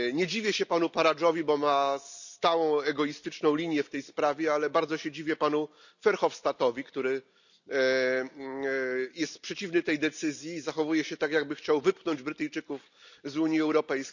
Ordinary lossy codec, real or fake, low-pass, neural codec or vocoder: none; real; 7.2 kHz; none